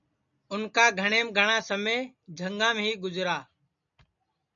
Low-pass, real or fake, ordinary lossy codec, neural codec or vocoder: 7.2 kHz; real; MP3, 96 kbps; none